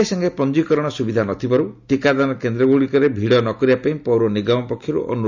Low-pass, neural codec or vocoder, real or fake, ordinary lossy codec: 7.2 kHz; none; real; none